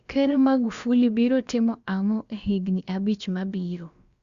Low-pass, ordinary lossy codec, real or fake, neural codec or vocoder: 7.2 kHz; Opus, 64 kbps; fake; codec, 16 kHz, about 1 kbps, DyCAST, with the encoder's durations